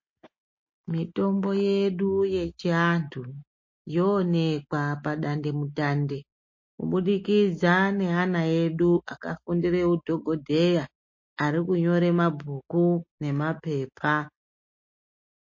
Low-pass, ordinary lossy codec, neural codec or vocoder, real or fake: 7.2 kHz; MP3, 32 kbps; none; real